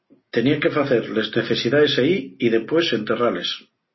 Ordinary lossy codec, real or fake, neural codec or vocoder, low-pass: MP3, 24 kbps; real; none; 7.2 kHz